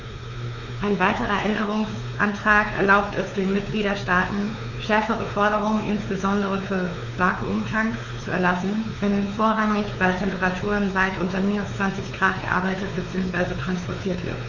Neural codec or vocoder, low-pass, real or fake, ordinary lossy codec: codec, 16 kHz, 4 kbps, X-Codec, WavLM features, trained on Multilingual LibriSpeech; 7.2 kHz; fake; Opus, 64 kbps